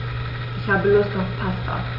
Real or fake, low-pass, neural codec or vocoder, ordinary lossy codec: real; 5.4 kHz; none; none